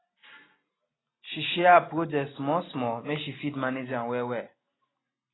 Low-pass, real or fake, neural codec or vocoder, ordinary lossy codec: 7.2 kHz; real; none; AAC, 16 kbps